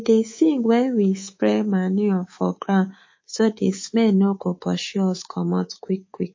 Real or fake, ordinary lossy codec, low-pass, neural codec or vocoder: fake; MP3, 32 kbps; 7.2 kHz; codec, 24 kHz, 3.1 kbps, DualCodec